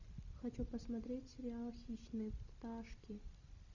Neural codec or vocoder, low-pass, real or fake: none; 7.2 kHz; real